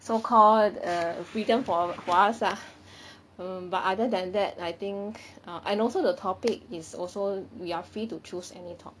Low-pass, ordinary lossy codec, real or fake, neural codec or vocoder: none; none; real; none